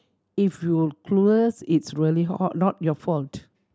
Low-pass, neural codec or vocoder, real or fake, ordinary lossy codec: none; none; real; none